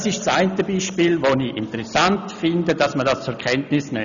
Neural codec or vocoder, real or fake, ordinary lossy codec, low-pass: none; real; none; 7.2 kHz